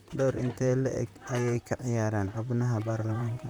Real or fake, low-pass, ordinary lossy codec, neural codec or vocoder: fake; none; none; vocoder, 44.1 kHz, 128 mel bands, Pupu-Vocoder